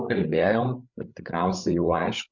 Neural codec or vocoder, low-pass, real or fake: codec, 16 kHz, 16 kbps, FreqCodec, larger model; 7.2 kHz; fake